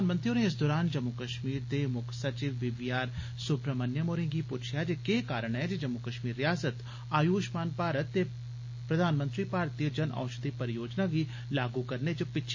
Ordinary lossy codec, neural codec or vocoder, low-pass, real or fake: MP3, 32 kbps; none; 7.2 kHz; real